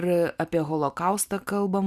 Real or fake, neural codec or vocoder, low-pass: fake; vocoder, 44.1 kHz, 128 mel bands every 512 samples, BigVGAN v2; 14.4 kHz